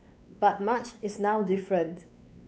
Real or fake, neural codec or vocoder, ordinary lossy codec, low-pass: fake; codec, 16 kHz, 2 kbps, X-Codec, WavLM features, trained on Multilingual LibriSpeech; none; none